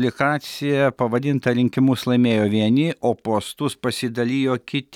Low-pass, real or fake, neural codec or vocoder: 19.8 kHz; real; none